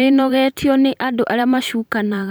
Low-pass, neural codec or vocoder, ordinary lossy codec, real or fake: none; vocoder, 44.1 kHz, 128 mel bands every 512 samples, BigVGAN v2; none; fake